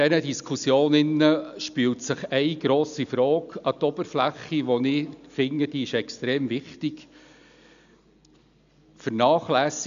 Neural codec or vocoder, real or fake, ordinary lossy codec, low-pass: none; real; none; 7.2 kHz